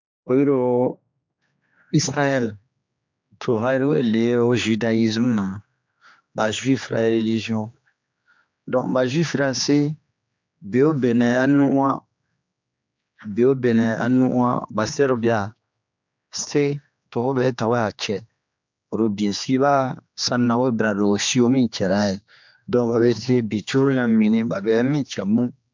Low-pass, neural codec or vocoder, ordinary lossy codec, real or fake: 7.2 kHz; codec, 16 kHz, 2 kbps, X-Codec, HuBERT features, trained on general audio; MP3, 64 kbps; fake